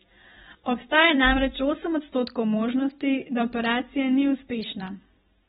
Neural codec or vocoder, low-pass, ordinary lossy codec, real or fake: vocoder, 44.1 kHz, 128 mel bands every 256 samples, BigVGAN v2; 19.8 kHz; AAC, 16 kbps; fake